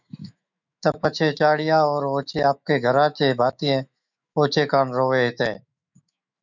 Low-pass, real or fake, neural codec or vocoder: 7.2 kHz; fake; autoencoder, 48 kHz, 128 numbers a frame, DAC-VAE, trained on Japanese speech